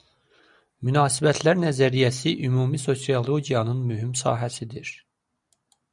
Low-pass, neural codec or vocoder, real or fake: 10.8 kHz; none; real